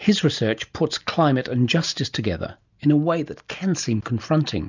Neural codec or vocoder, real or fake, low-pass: none; real; 7.2 kHz